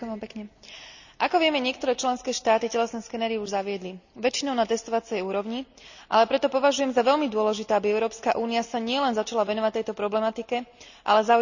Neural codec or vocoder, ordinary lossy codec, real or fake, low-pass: none; none; real; 7.2 kHz